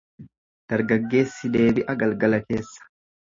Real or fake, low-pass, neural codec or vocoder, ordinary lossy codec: real; 7.2 kHz; none; MP3, 32 kbps